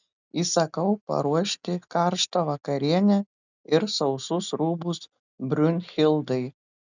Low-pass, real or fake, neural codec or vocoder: 7.2 kHz; real; none